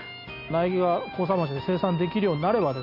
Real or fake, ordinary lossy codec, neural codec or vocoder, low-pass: real; none; none; 5.4 kHz